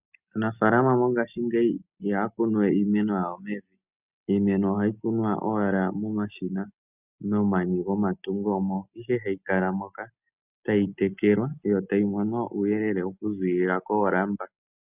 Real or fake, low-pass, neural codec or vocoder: real; 3.6 kHz; none